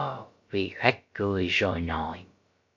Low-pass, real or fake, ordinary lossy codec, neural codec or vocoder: 7.2 kHz; fake; MP3, 48 kbps; codec, 16 kHz, about 1 kbps, DyCAST, with the encoder's durations